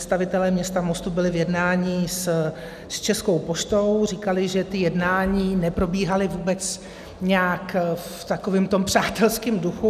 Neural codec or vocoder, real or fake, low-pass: none; real; 14.4 kHz